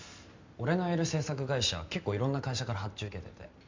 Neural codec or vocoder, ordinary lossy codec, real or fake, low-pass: none; MP3, 64 kbps; real; 7.2 kHz